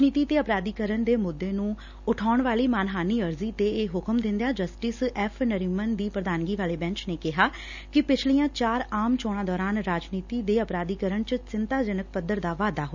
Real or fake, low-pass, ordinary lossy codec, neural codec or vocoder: real; 7.2 kHz; none; none